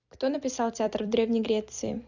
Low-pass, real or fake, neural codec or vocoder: 7.2 kHz; fake; vocoder, 44.1 kHz, 128 mel bands every 256 samples, BigVGAN v2